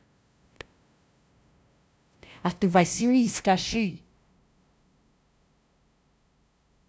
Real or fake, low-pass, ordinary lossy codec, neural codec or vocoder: fake; none; none; codec, 16 kHz, 0.5 kbps, FunCodec, trained on LibriTTS, 25 frames a second